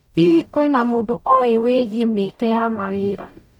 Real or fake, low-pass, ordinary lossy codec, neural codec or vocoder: fake; 19.8 kHz; none; codec, 44.1 kHz, 0.9 kbps, DAC